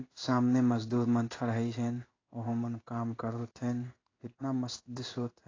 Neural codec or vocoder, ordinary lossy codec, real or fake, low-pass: codec, 16 kHz in and 24 kHz out, 1 kbps, XY-Tokenizer; AAC, 32 kbps; fake; 7.2 kHz